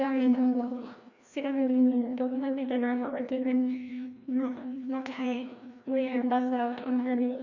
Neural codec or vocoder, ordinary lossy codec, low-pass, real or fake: codec, 16 kHz, 1 kbps, FreqCodec, larger model; Opus, 64 kbps; 7.2 kHz; fake